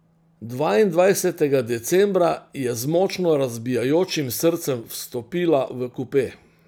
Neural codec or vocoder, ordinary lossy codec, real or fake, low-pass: none; none; real; none